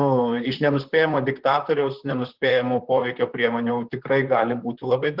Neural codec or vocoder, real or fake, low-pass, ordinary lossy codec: vocoder, 44.1 kHz, 128 mel bands, Pupu-Vocoder; fake; 5.4 kHz; Opus, 16 kbps